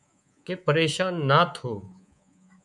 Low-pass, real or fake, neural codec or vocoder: 10.8 kHz; fake; codec, 24 kHz, 3.1 kbps, DualCodec